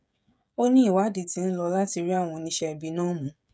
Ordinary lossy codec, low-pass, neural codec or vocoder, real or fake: none; none; codec, 16 kHz, 16 kbps, FreqCodec, smaller model; fake